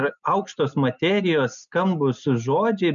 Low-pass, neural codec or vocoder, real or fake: 7.2 kHz; none; real